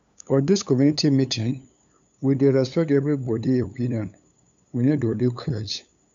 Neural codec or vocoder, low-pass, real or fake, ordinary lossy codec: codec, 16 kHz, 8 kbps, FunCodec, trained on LibriTTS, 25 frames a second; 7.2 kHz; fake; none